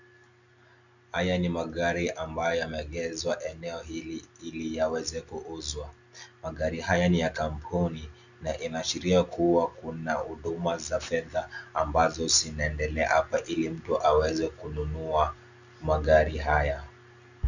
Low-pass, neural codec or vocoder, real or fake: 7.2 kHz; none; real